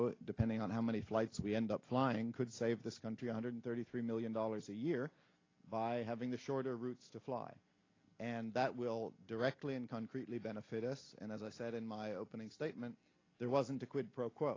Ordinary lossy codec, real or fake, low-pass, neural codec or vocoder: AAC, 32 kbps; real; 7.2 kHz; none